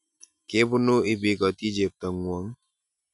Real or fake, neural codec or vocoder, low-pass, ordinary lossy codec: real; none; 10.8 kHz; none